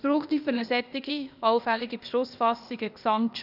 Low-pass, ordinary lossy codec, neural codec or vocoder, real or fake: 5.4 kHz; none; codec, 16 kHz, 0.8 kbps, ZipCodec; fake